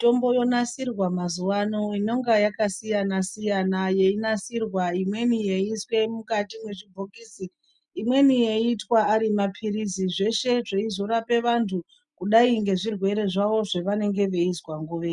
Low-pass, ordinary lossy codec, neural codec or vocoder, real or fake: 10.8 kHz; MP3, 96 kbps; none; real